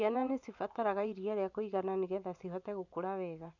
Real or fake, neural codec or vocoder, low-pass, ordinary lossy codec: fake; vocoder, 24 kHz, 100 mel bands, Vocos; 7.2 kHz; none